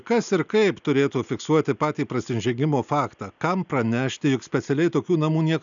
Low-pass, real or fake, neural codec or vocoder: 7.2 kHz; real; none